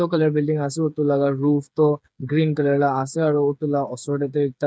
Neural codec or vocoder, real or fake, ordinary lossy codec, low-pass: codec, 16 kHz, 8 kbps, FreqCodec, smaller model; fake; none; none